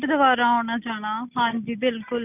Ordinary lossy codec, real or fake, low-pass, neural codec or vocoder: none; real; 3.6 kHz; none